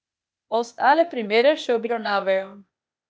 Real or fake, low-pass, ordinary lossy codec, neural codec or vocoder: fake; none; none; codec, 16 kHz, 0.8 kbps, ZipCodec